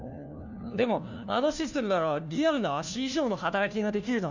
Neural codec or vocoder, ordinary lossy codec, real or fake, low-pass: codec, 16 kHz, 1 kbps, FunCodec, trained on LibriTTS, 50 frames a second; none; fake; 7.2 kHz